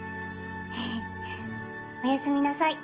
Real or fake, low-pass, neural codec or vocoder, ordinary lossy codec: real; 3.6 kHz; none; Opus, 16 kbps